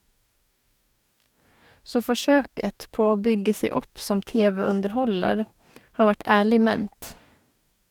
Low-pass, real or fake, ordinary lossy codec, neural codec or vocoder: 19.8 kHz; fake; none; codec, 44.1 kHz, 2.6 kbps, DAC